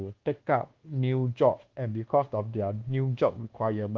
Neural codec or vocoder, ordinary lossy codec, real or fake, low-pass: codec, 24 kHz, 1.2 kbps, DualCodec; Opus, 16 kbps; fake; 7.2 kHz